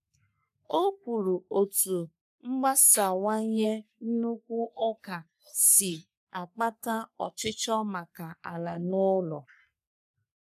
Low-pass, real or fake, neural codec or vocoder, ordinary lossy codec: 14.4 kHz; fake; codec, 44.1 kHz, 3.4 kbps, Pupu-Codec; none